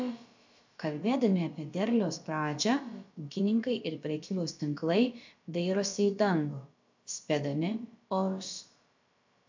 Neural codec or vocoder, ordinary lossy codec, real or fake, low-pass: codec, 16 kHz, about 1 kbps, DyCAST, with the encoder's durations; MP3, 64 kbps; fake; 7.2 kHz